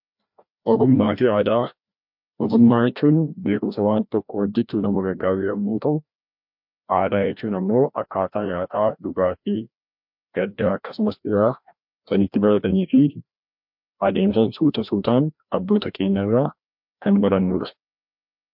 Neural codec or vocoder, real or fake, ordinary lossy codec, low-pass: codec, 16 kHz, 1 kbps, FreqCodec, larger model; fake; MP3, 48 kbps; 5.4 kHz